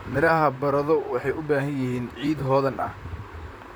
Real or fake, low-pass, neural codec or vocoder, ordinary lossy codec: fake; none; vocoder, 44.1 kHz, 128 mel bands, Pupu-Vocoder; none